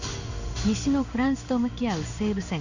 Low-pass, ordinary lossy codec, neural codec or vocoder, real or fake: 7.2 kHz; Opus, 64 kbps; codec, 16 kHz in and 24 kHz out, 1 kbps, XY-Tokenizer; fake